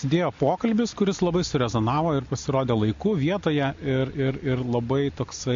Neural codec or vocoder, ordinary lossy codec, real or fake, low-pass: none; MP3, 48 kbps; real; 7.2 kHz